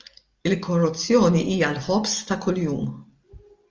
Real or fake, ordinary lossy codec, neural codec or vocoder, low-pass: real; Opus, 24 kbps; none; 7.2 kHz